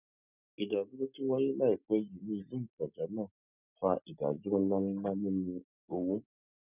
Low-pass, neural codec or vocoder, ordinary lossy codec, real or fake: 3.6 kHz; none; none; real